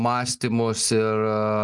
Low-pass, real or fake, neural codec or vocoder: 10.8 kHz; real; none